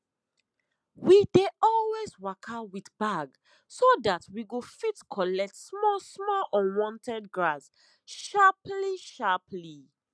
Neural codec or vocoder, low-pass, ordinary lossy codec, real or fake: none; none; none; real